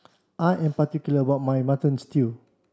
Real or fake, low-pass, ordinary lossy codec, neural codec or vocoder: real; none; none; none